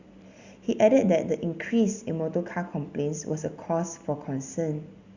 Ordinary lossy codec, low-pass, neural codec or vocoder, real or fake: Opus, 64 kbps; 7.2 kHz; none; real